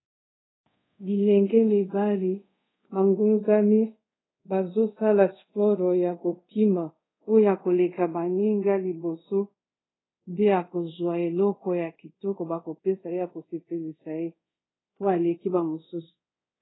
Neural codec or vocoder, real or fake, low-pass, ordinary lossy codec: codec, 24 kHz, 0.5 kbps, DualCodec; fake; 7.2 kHz; AAC, 16 kbps